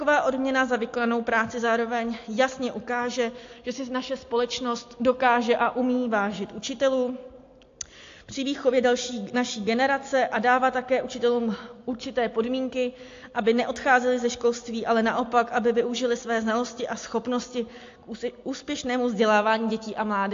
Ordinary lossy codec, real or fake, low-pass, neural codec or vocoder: AAC, 48 kbps; real; 7.2 kHz; none